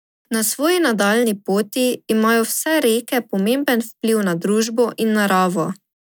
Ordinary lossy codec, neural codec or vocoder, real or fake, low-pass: none; none; real; none